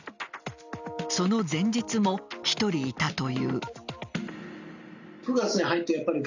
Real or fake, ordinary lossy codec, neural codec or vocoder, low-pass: real; none; none; 7.2 kHz